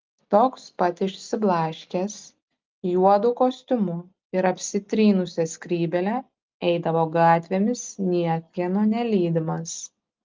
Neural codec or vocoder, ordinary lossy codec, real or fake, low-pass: none; Opus, 32 kbps; real; 7.2 kHz